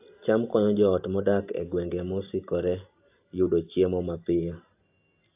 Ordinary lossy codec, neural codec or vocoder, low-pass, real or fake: AAC, 32 kbps; none; 3.6 kHz; real